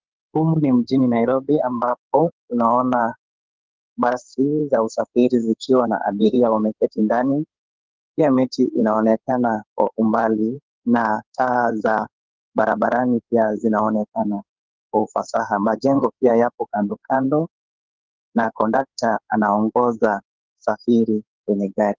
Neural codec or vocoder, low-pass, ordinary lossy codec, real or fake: codec, 16 kHz in and 24 kHz out, 2.2 kbps, FireRedTTS-2 codec; 7.2 kHz; Opus, 16 kbps; fake